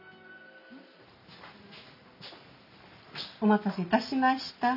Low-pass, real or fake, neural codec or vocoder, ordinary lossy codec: 5.4 kHz; real; none; none